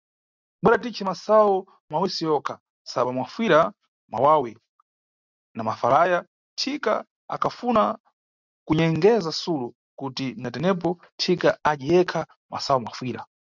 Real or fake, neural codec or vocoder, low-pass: real; none; 7.2 kHz